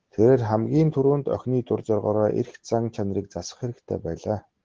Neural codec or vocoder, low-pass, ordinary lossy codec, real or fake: none; 7.2 kHz; Opus, 16 kbps; real